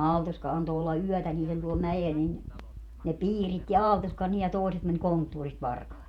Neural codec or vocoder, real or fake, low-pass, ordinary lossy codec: vocoder, 48 kHz, 128 mel bands, Vocos; fake; 19.8 kHz; none